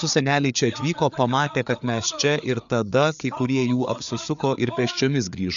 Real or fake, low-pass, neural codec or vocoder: fake; 7.2 kHz; codec, 16 kHz, 4 kbps, FreqCodec, larger model